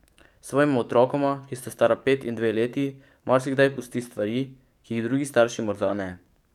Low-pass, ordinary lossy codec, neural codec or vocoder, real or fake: 19.8 kHz; none; codec, 44.1 kHz, 7.8 kbps, DAC; fake